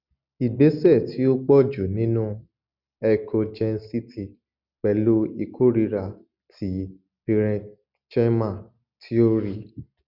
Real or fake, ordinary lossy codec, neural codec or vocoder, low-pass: real; none; none; 5.4 kHz